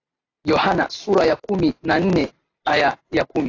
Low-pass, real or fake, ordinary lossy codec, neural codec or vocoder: 7.2 kHz; real; AAC, 32 kbps; none